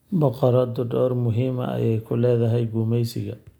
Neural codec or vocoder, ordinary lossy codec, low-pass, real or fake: none; none; 19.8 kHz; real